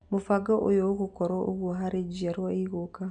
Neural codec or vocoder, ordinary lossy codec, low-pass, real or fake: none; none; 9.9 kHz; real